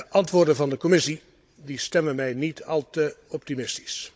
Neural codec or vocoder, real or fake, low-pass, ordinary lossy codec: codec, 16 kHz, 16 kbps, FunCodec, trained on Chinese and English, 50 frames a second; fake; none; none